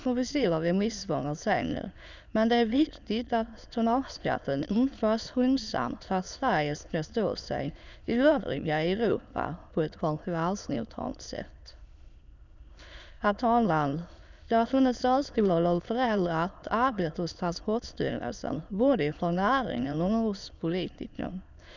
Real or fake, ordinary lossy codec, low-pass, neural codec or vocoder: fake; none; 7.2 kHz; autoencoder, 22.05 kHz, a latent of 192 numbers a frame, VITS, trained on many speakers